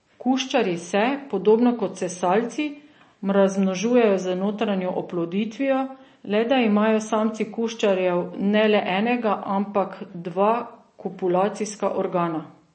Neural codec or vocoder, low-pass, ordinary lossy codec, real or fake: none; 9.9 kHz; MP3, 32 kbps; real